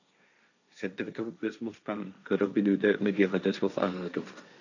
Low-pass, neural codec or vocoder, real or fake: 7.2 kHz; codec, 16 kHz, 1.1 kbps, Voila-Tokenizer; fake